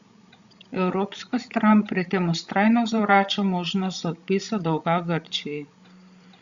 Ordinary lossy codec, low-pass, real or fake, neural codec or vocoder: Opus, 64 kbps; 7.2 kHz; fake; codec, 16 kHz, 16 kbps, FreqCodec, larger model